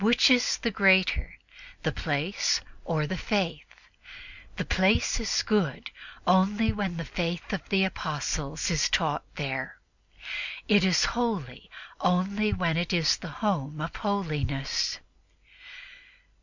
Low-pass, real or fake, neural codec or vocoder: 7.2 kHz; real; none